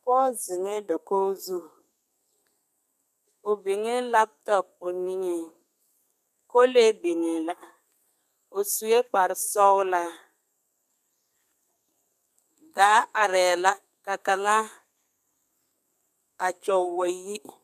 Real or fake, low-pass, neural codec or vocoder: fake; 14.4 kHz; codec, 32 kHz, 1.9 kbps, SNAC